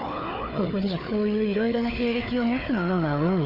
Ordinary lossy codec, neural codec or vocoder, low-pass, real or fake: Opus, 64 kbps; codec, 16 kHz, 4 kbps, FunCodec, trained on Chinese and English, 50 frames a second; 5.4 kHz; fake